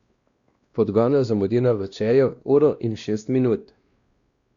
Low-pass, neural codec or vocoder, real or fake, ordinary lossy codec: 7.2 kHz; codec, 16 kHz, 1 kbps, X-Codec, WavLM features, trained on Multilingual LibriSpeech; fake; Opus, 64 kbps